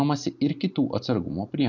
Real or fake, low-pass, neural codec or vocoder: real; 7.2 kHz; none